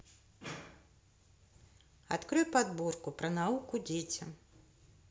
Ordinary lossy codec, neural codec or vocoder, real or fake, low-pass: none; none; real; none